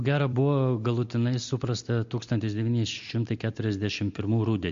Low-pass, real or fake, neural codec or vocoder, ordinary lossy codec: 7.2 kHz; real; none; MP3, 48 kbps